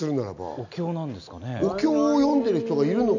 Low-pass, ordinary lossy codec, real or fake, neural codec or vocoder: 7.2 kHz; none; real; none